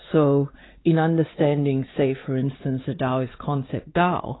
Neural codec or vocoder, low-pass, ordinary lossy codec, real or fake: codec, 16 kHz, 4 kbps, X-Codec, WavLM features, trained on Multilingual LibriSpeech; 7.2 kHz; AAC, 16 kbps; fake